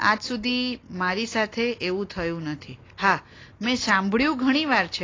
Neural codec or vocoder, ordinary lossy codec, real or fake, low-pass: none; AAC, 32 kbps; real; 7.2 kHz